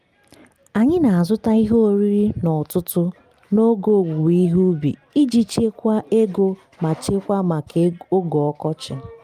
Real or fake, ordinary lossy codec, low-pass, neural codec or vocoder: real; Opus, 32 kbps; 14.4 kHz; none